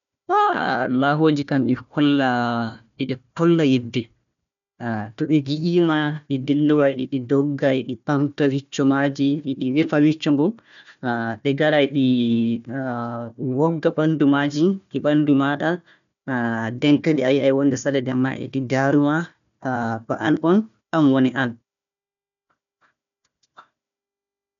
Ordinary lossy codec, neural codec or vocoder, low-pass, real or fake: none; codec, 16 kHz, 1 kbps, FunCodec, trained on Chinese and English, 50 frames a second; 7.2 kHz; fake